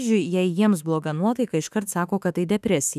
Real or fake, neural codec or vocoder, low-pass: fake; autoencoder, 48 kHz, 32 numbers a frame, DAC-VAE, trained on Japanese speech; 14.4 kHz